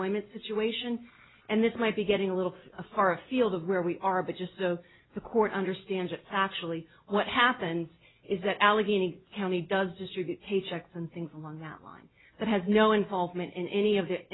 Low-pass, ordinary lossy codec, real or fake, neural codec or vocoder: 7.2 kHz; AAC, 16 kbps; real; none